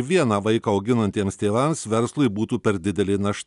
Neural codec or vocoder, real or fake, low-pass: none; real; 10.8 kHz